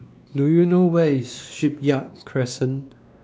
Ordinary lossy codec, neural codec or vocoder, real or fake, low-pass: none; codec, 16 kHz, 2 kbps, X-Codec, WavLM features, trained on Multilingual LibriSpeech; fake; none